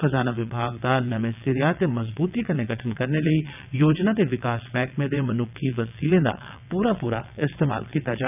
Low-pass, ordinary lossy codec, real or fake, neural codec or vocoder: 3.6 kHz; none; fake; vocoder, 22.05 kHz, 80 mel bands, WaveNeXt